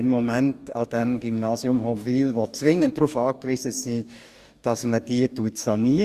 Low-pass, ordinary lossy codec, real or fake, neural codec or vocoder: 14.4 kHz; Opus, 64 kbps; fake; codec, 44.1 kHz, 2.6 kbps, DAC